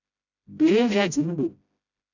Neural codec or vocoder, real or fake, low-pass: codec, 16 kHz, 0.5 kbps, FreqCodec, smaller model; fake; 7.2 kHz